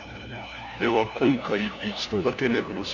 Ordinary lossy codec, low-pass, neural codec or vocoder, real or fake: none; 7.2 kHz; codec, 16 kHz, 1 kbps, FunCodec, trained on LibriTTS, 50 frames a second; fake